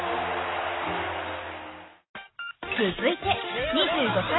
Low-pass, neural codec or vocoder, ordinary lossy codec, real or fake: 7.2 kHz; none; AAC, 16 kbps; real